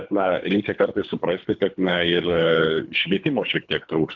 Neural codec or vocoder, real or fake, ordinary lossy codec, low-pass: codec, 24 kHz, 3 kbps, HILCodec; fake; AAC, 48 kbps; 7.2 kHz